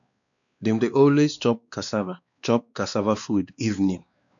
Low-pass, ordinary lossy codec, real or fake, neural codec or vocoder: 7.2 kHz; none; fake; codec, 16 kHz, 2 kbps, X-Codec, WavLM features, trained on Multilingual LibriSpeech